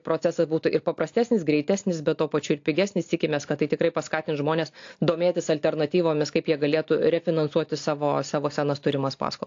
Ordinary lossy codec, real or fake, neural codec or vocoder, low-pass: AAC, 48 kbps; real; none; 7.2 kHz